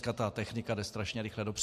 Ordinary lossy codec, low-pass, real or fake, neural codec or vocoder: MP3, 64 kbps; 14.4 kHz; real; none